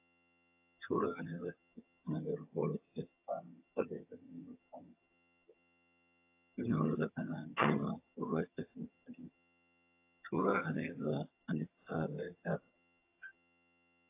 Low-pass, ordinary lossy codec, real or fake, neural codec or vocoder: 3.6 kHz; AAC, 24 kbps; fake; vocoder, 22.05 kHz, 80 mel bands, HiFi-GAN